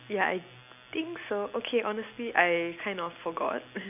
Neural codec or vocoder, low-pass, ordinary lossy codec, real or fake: none; 3.6 kHz; none; real